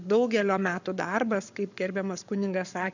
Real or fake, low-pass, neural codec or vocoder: fake; 7.2 kHz; codec, 44.1 kHz, 7.8 kbps, Pupu-Codec